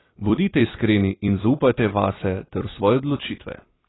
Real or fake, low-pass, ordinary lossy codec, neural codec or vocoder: fake; 7.2 kHz; AAC, 16 kbps; vocoder, 44.1 kHz, 128 mel bands every 256 samples, BigVGAN v2